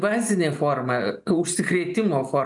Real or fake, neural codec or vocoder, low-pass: real; none; 10.8 kHz